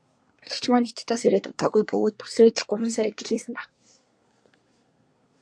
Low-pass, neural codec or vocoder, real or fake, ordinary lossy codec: 9.9 kHz; codec, 24 kHz, 1 kbps, SNAC; fake; AAC, 64 kbps